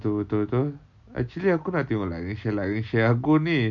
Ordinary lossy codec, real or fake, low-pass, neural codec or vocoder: none; real; 7.2 kHz; none